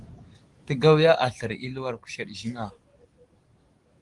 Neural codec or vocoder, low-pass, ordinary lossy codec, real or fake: codec, 44.1 kHz, 7.8 kbps, DAC; 10.8 kHz; Opus, 24 kbps; fake